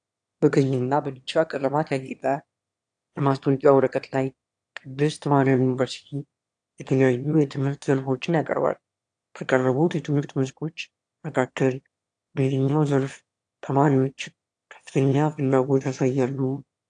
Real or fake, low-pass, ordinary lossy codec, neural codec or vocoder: fake; 9.9 kHz; AAC, 64 kbps; autoencoder, 22.05 kHz, a latent of 192 numbers a frame, VITS, trained on one speaker